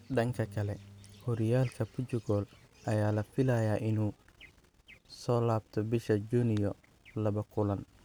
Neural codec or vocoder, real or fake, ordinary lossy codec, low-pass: none; real; none; none